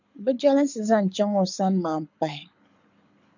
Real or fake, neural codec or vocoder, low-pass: fake; codec, 24 kHz, 6 kbps, HILCodec; 7.2 kHz